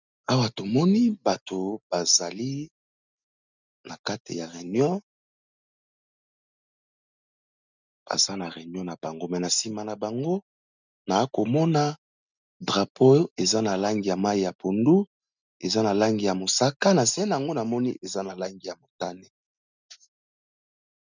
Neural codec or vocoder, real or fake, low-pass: none; real; 7.2 kHz